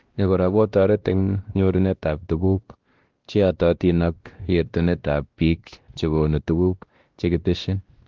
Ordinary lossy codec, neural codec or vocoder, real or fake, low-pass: Opus, 16 kbps; codec, 16 kHz, 1 kbps, X-Codec, WavLM features, trained on Multilingual LibriSpeech; fake; 7.2 kHz